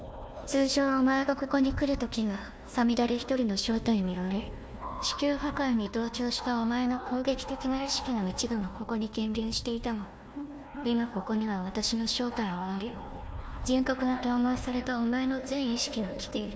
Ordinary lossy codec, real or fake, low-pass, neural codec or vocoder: none; fake; none; codec, 16 kHz, 1 kbps, FunCodec, trained on Chinese and English, 50 frames a second